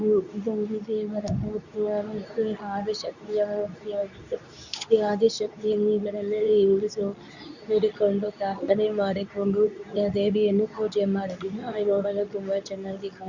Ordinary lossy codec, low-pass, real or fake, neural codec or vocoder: none; 7.2 kHz; fake; codec, 24 kHz, 0.9 kbps, WavTokenizer, medium speech release version 2